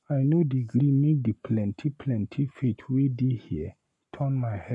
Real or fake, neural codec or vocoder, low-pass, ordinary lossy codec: fake; codec, 44.1 kHz, 7.8 kbps, Pupu-Codec; 10.8 kHz; none